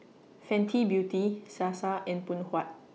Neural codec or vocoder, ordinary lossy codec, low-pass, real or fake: none; none; none; real